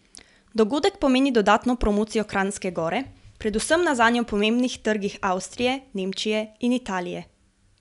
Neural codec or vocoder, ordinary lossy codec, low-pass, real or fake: none; none; 10.8 kHz; real